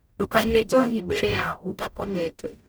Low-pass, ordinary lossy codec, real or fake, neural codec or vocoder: none; none; fake; codec, 44.1 kHz, 0.9 kbps, DAC